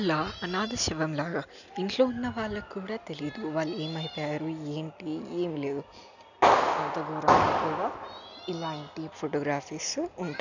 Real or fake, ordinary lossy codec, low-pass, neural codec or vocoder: real; none; 7.2 kHz; none